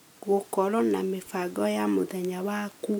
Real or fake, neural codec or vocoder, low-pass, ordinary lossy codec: real; none; none; none